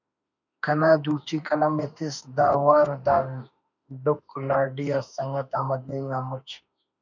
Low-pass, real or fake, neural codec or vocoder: 7.2 kHz; fake; autoencoder, 48 kHz, 32 numbers a frame, DAC-VAE, trained on Japanese speech